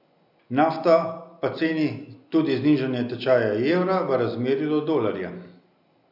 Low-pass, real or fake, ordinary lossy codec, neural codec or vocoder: 5.4 kHz; real; none; none